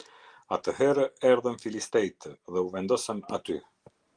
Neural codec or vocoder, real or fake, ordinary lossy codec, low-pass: none; real; Opus, 24 kbps; 9.9 kHz